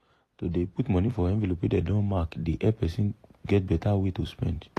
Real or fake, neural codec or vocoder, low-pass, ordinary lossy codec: fake; vocoder, 44.1 kHz, 128 mel bands every 256 samples, BigVGAN v2; 14.4 kHz; AAC, 48 kbps